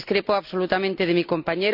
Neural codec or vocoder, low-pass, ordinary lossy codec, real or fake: none; 5.4 kHz; none; real